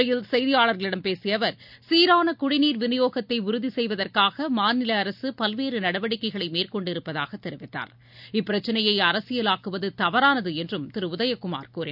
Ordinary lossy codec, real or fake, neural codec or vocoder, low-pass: none; real; none; 5.4 kHz